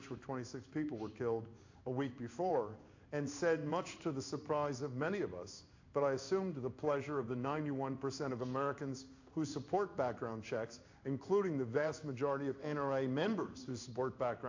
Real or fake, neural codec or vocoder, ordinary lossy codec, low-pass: fake; codec, 16 kHz in and 24 kHz out, 1 kbps, XY-Tokenizer; AAC, 48 kbps; 7.2 kHz